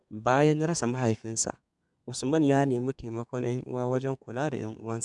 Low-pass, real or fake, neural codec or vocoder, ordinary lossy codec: 10.8 kHz; fake; codec, 32 kHz, 1.9 kbps, SNAC; none